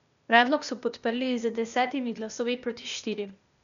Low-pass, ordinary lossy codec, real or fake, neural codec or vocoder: 7.2 kHz; MP3, 96 kbps; fake; codec, 16 kHz, 0.8 kbps, ZipCodec